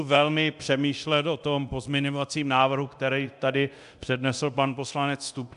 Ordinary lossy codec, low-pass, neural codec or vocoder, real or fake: AAC, 96 kbps; 10.8 kHz; codec, 24 kHz, 0.9 kbps, DualCodec; fake